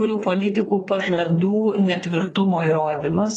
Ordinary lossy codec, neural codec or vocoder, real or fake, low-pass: AAC, 32 kbps; codec, 24 kHz, 1 kbps, SNAC; fake; 10.8 kHz